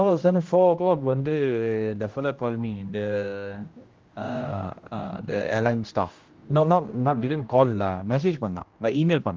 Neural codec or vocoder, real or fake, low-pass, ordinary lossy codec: codec, 16 kHz, 1 kbps, X-Codec, HuBERT features, trained on general audio; fake; 7.2 kHz; Opus, 32 kbps